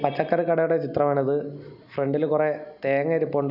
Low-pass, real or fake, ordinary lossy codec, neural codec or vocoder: 5.4 kHz; real; none; none